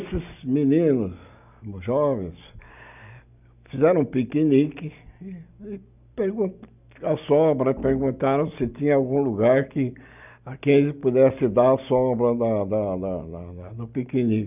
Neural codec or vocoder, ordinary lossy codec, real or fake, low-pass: none; none; real; 3.6 kHz